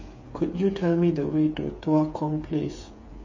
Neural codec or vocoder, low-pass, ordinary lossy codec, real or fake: codec, 16 kHz, 8 kbps, FreqCodec, smaller model; 7.2 kHz; MP3, 32 kbps; fake